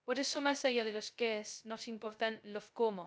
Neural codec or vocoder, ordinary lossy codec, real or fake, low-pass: codec, 16 kHz, 0.2 kbps, FocalCodec; none; fake; none